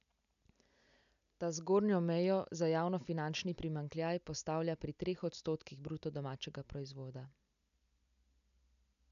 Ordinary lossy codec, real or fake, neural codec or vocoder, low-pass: none; real; none; 7.2 kHz